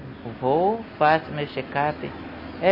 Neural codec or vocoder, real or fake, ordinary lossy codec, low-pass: none; real; MP3, 24 kbps; 5.4 kHz